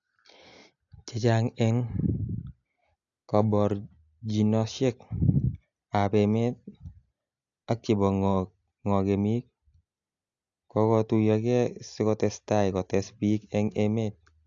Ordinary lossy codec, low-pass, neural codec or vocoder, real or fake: AAC, 64 kbps; 7.2 kHz; none; real